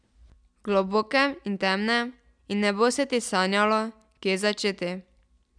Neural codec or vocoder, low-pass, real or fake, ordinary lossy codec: none; 9.9 kHz; real; none